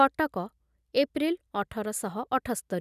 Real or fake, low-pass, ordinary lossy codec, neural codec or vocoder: real; 14.4 kHz; none; none